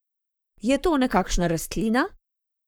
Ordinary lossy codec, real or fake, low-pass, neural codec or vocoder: none; fake; none; codec, 44.1 kHz, 7.8 kbps, Pupu-Codec